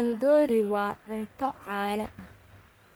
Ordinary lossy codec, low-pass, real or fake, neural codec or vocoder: none; none; fake; codec, 44.1 kHz, 1.7 kbps, Pupu-Codec